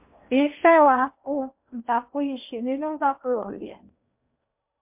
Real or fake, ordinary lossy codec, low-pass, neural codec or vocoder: fake; MP3, 32 kbps; 3.6 kHz; codec, 16 kHz in and 24 kHz out, 0.8 kbps, FocalCodec, streaming, 65536 codes